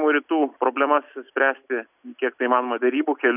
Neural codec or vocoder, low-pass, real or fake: none; 3.6 kHz; real